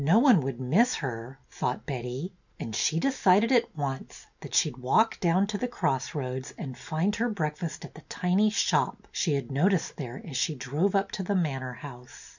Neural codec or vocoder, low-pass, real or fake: none; 7.2 kHz; real